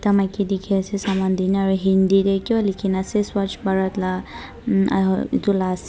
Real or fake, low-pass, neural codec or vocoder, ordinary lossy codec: real; none; none; none